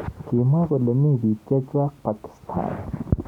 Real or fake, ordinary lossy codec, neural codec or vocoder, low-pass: real; none; none; 19.8 kHz